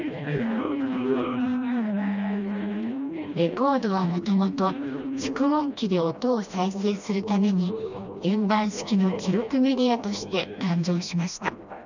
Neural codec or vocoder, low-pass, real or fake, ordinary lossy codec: codec, 16 kHz, 1 kbps, FreqCodec, smaller model; 7.2 kHz; fake; none